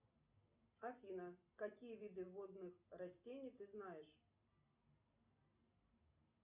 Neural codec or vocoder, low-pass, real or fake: none; 3.6 kHz; real